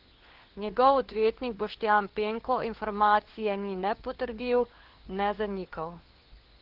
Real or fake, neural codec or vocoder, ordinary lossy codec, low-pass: fake; codec, 16 kHz, 4 kbps, FunCodec, trained on LibriTTS, 50 frames a second; Opus, 16 kbps; 5.4 kHz